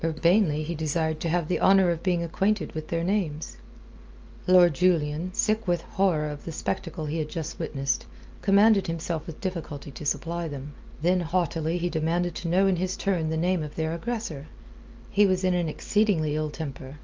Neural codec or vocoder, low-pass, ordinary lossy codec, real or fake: none; 7.2 kHz; Opus, 24 kbps; real